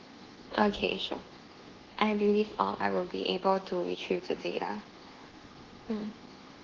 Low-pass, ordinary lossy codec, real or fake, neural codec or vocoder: 7.2 kHz; Opus, 16 kbps; fake; codec, 24 kHz, 1.2 kbps, DualCodec